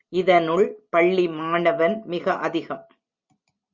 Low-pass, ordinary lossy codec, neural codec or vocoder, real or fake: 7.2 kHz; Opus, 64 kbps; none; real